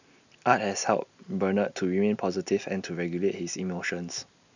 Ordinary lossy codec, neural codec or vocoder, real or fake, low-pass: none; none; real; 7.2 kHz